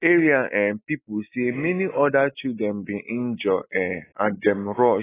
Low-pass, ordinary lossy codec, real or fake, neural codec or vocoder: 3.6 kHz; AAC, 16 kbps; real; none